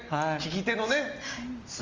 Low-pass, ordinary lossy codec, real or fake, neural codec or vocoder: 7.2 kHz; Opus, 32 kbps; real; none